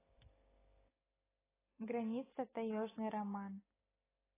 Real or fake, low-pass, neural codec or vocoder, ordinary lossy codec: real; 3.6 kHz; none; AAC, 16 kbps